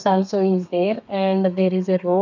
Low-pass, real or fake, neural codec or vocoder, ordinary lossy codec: 7.2 kHz; fake; codec, 32 kHz, 1.9 kbps, SNAC; none